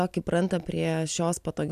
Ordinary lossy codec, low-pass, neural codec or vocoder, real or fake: AAC, 96 kbps; 14.4 kHz; none; real